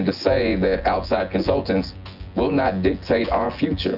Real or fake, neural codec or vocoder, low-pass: fake; vocoder, 24 kHz, 100 mel bands, Vocos; 5.4 kHz